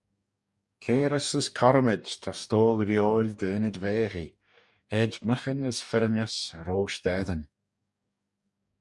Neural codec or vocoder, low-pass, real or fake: codec, 44.1 kHz, 2.6 kbps, DAC; 10.8 kHz; fake